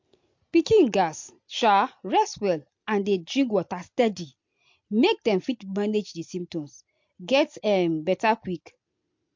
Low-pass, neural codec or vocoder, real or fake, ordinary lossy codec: 7.2 kHz; none; real; MP3, 48 kbps